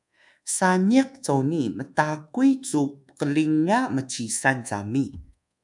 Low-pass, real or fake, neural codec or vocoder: 10.8 kHz; fake; codec, 24 kHz, 1.2 kbps, DualCodec